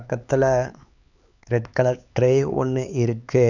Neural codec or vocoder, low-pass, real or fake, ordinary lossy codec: codec, 16 kHz, 4 kbps, X-Codec, HuBERT features, trained on LibriSpeech; 7.2 kHz; fake; none